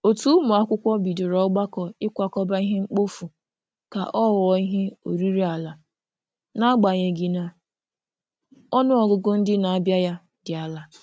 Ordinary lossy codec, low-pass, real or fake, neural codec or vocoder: none; none; real; none